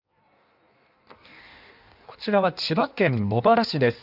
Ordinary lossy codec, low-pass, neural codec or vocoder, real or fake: none; 5.4 kHz; codec, 16 kHz in and 24 kHz out, 1.1 kbps, FireRedTTS-2 codec; fake